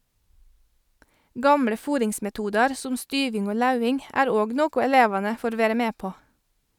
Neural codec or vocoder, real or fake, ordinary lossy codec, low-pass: none; real; none; 19.8 kHz